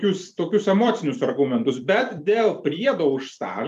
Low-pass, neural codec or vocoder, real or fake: 14.4 kHz; vocoder, 44.1 kHz, 128 mel bands every 256 samples, BigVGAN v2; fake